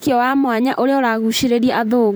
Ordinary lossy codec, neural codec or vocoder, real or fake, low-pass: none; vocoder, 44.1 kHz, 128 mel bands, Pupu-Vocoder; fake; none